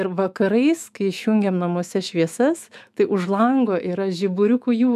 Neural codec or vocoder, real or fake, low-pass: autoencoder, 48 kHz, 128 numbers a frame, DAC-VAE, trained on Japanese speech; fake; 14.4 kHz